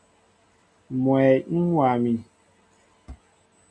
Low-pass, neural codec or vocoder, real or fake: 9.9 kHz; none; real